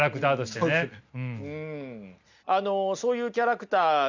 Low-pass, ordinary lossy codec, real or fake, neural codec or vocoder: 7.2 kHz; Opus, 64 kbps; real; none